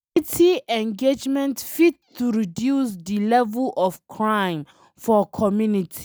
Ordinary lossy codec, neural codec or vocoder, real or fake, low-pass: none; none; real; none